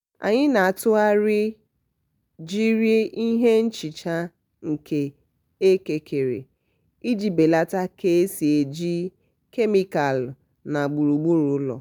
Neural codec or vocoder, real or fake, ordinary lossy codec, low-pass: none; real; none; 19.8 kHz